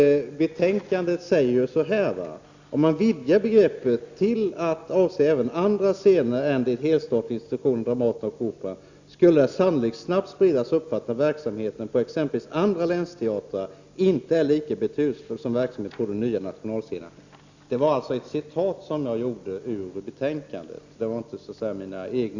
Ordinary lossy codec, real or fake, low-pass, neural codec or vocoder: Opus, 64 kbps; real; 7.2 kHz; none